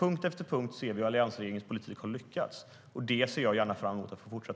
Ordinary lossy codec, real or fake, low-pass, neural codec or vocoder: none; real; none; none